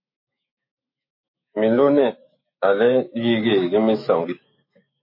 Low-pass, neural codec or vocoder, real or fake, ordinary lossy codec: 5.4 kHz; autoencoder, 48 kHz, 128 numbers a frame, DAC-VAE, trained on Japanese speech; fake; MP3, 24 kbps